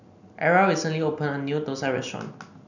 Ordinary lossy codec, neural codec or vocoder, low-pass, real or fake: none; none; 7.2 kHz; real